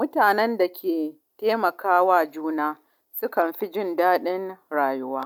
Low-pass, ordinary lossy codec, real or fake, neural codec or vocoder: 19.8 kHz; none; real; none